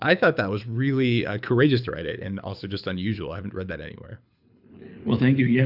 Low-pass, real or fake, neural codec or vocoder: 5.4 kHz; fake; codec, 24 kHz, 6 kbps, HILCodec